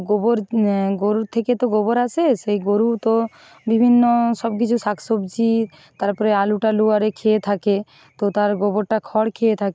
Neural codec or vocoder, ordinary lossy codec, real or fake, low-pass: none; none; real; none